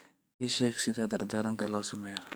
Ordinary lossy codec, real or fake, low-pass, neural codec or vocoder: none; fake; none; codec, 44.1 kHz, 2.6 kbps, SNAC